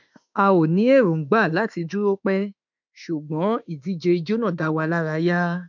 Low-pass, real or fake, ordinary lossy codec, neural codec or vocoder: 7.2 kHz; fake; MP3, 64 kbps; autoencoder, 48 kHz, 32 numbers a frame, DAC-VAE, trained on Japanese speech